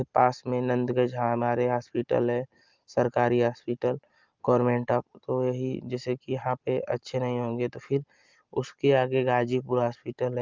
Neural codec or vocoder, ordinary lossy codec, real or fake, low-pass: none; Opus, 24 kbps; real; 7.2 kHz